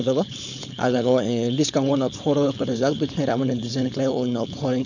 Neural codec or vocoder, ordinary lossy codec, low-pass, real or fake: codec, 16 kHz, 4.8 kbps, FACodec; none; 7.2 kHz; fake